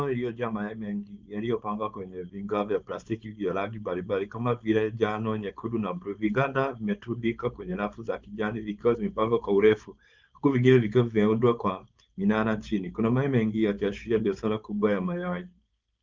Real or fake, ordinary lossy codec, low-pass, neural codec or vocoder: fake; Opus, 24 kbps; 7.2 kHz; codec, 16 kHz, 4.8 kbps, FACodec